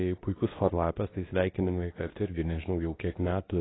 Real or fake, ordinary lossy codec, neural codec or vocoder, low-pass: fake; AAC, 16 kbps; codec, 24 kHz, 0.9 kbps, WavTokenizer, medium speech release version 2; 7.2 kHz